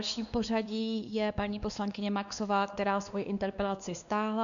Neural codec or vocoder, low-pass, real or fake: codec, 16 kHz, 2 kbps, X-Codec, WavLM features, trained on Multilingual LibriSpeech; 7.2 kHz; fake